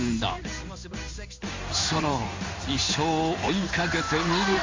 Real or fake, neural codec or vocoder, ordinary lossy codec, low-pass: fake; codec, 16 kHz in and 24 kHz out, 1 kbps, XY-Tokenizer; MP3, 48 kbps; 7.2 kHz